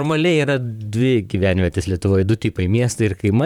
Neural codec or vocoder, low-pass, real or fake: vocoder, 44.1 kHz, 128 mel bands, Pupu-Vocoder; 19.8 kHz; fake